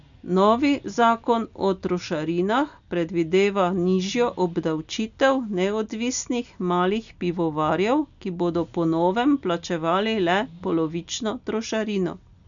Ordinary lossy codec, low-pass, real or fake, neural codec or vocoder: none; 7.2 kHz; real; none